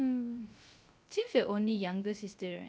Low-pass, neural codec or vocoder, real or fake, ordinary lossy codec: none; codec, 16 kHz, 0.3 kbps, FocalCodec; fake; none